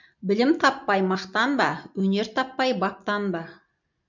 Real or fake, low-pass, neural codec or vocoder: real; 7.2 kHz; none